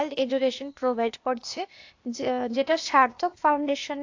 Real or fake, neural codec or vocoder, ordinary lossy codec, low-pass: fake; codec, 16 kHz, 0.8 kbps, ZipCodec; AAC, 48 kbps; 7.2 kHz